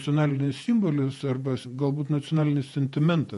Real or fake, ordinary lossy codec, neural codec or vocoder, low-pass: fake; MP3, 48 kbps; vocoder, 48 kHz, 128 mel bands, Vocos; 14.4 kHz